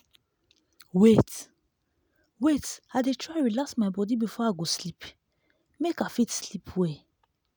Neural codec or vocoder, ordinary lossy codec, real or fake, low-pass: none; none; real; none